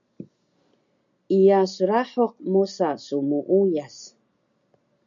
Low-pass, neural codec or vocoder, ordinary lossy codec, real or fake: 7.2 kHz; none; MP3, 96 kbps; real